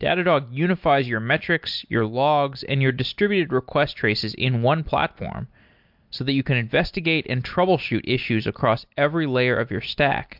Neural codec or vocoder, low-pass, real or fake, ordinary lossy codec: none; 5.4 kHz; real; MP3, 48 kbps